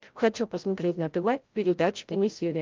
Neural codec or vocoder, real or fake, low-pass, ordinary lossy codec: codec, 16 kHz, 0.5 kbps, FreqCodec, larger model; fake; 7.2 kHz; Opus, 32 kbps